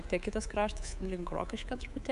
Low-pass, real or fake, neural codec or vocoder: 10.8 kHz; fake; codec, 24 kHz, 3.1 kbps, DualCodec